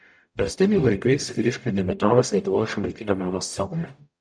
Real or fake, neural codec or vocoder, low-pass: fake; codec, 44.1 kHz, 0.9 kbps, DAC; 9.9 kHz